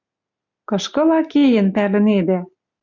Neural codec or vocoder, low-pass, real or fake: none; 7.2 kHz; real